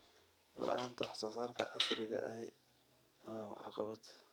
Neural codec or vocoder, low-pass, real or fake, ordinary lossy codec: codec, 44.1 kHz, 2.6 kbps, SNAC; none; fake; none